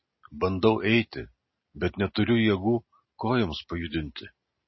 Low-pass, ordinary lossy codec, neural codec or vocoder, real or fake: 7.2 kHz; MP3, 24 kbps; none; real